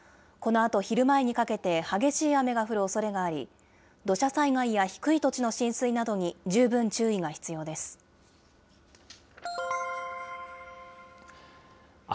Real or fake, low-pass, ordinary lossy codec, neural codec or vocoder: real; none; none; none